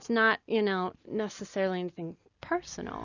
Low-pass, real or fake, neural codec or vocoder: 7.2 kHz; real; none